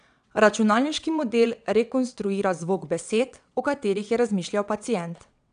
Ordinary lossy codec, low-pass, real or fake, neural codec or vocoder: none; 9.9 kHz; fake; vocoder, 22.05 kHz, 80 mel bands, WaveNeXt